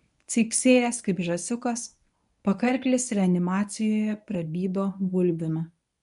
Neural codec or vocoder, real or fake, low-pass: codec, 24 kHz, 0.9 kbps, WavTokenizer, medium speech release version 1; fake; 10.8 kHz